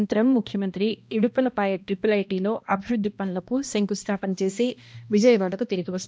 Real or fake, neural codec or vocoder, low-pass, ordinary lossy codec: fake; codec, 16 kHz, 1 kbps, X-Codec, HuBERT features, trained on balanced general audio; none; none